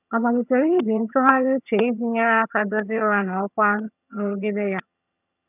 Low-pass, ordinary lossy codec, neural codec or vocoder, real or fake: 3.6 kHz; none; vocoder, 22.05 kHz, 80 mel bands, HiFi-GAN; fake